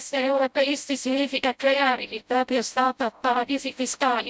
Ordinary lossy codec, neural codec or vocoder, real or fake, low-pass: none; codec, 16 kHz, 0.5 kbps, FreqCodec, smaller model; fake; none